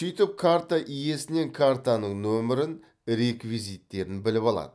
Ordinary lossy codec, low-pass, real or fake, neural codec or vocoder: none; none; real; none